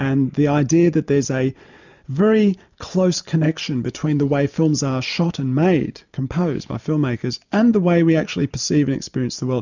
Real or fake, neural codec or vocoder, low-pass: real; none; 7.2 kHz